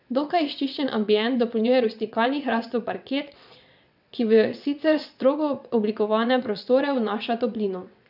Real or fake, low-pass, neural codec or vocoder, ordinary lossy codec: fake; 5.4 kHz; vocoder, 22.05 kHz, 80 mel bands, WaveNeXt; none